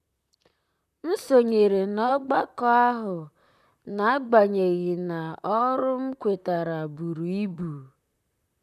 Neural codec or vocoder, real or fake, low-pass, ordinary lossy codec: vocoder, 44.1 kHz, 128 mel bands, Pupu-Vocoder; fake; 14.4 kHz; none